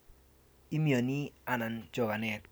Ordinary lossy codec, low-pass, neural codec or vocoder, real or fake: none; none; none; real